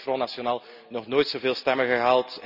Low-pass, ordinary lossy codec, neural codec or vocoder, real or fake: 5.4 kHz; none; none; real